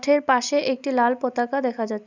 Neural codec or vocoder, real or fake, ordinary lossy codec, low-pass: none; real; none; 7.2 kHz